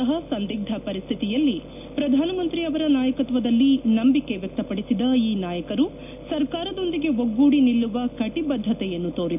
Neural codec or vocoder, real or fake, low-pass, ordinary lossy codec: none; real; 3.6 kHz; none